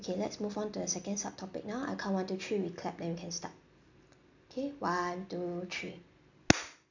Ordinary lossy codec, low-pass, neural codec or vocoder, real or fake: none; 7.2 kHz; none; real